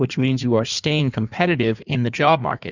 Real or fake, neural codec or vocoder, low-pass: fake; codec, 16 kHz in and 24 kHz out, 1.1 kbps, FireRedTTS-2 codec; 7.2 kHz